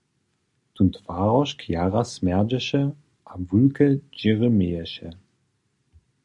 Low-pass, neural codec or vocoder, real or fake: 10.8 kHz; none; real